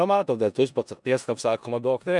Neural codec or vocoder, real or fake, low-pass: codec, 16 kHz in and 24 kHz out, 0.4 kbps, LongCat-Audio-Codec, four codebook decoder; fake; 10.8 kHz